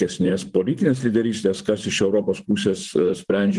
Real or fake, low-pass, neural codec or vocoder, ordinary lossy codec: fake; 10.8 kHz; vocoder, 44.1 kHz, 128 mel bands, Pupu-Vocoder; Opus, 24 kbps